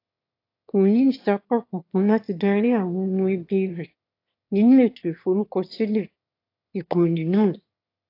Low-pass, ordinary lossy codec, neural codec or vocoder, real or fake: 5.4 kHz; AAC, 32 kbps; autoencoder, 22.05 kHz, a latent of 192 numbers a frame, VITS, trained on one speaker; fake